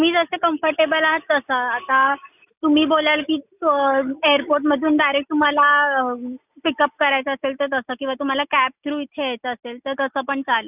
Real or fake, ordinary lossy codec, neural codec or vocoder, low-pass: real; none; none; 3.6 kHz